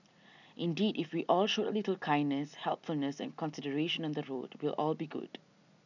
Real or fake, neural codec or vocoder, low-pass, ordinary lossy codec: real; none; 7.2 kHz; none